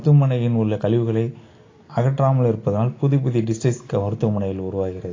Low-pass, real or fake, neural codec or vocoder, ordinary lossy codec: 7.2 kHz; real; none; AAC, 32 kbps